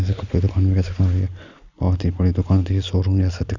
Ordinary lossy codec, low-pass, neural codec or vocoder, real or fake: Opus, 64 kbps; 7.2 kHz; none; real